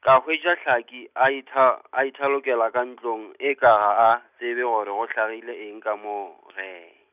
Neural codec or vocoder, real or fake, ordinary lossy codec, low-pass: none; real; none; 3.6 kHz